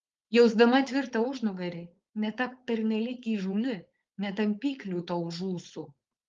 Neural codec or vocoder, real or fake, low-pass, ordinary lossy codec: codec, 16 kHz, 4.8 kbps, FACodec; fake; 7.2 kHz; Opus, 32 kbps